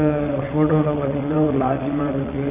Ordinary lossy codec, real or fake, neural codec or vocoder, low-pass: none; fake; vocoder, 22.05 kHz, 80 mel bands, WaveNeXt; 3.6 kHz